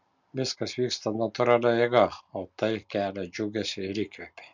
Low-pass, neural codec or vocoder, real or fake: 7.2 kHz; none; real